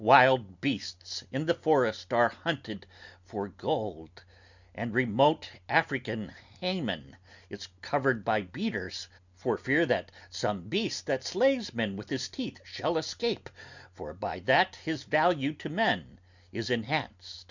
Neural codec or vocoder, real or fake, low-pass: none; real; 7.2 kHz